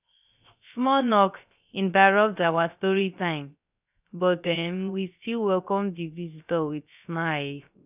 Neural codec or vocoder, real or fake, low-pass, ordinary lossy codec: codec, 16 kHz, 0.3 kbps, FocalCodec; fake; 3.6 kHz; none